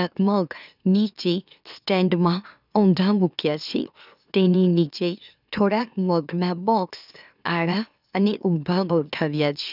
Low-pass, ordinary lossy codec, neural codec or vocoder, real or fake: 5.4 kHz; none; autoencoder, 44.1 kHz, a latent of 192 numbers a frame, MeloTTS; fake